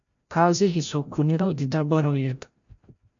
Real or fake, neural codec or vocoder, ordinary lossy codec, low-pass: fake; codec, 16 kHz, 0.5 kbps, FreqCodec, larger model; AAC, 64 kbps; 7.2 kHz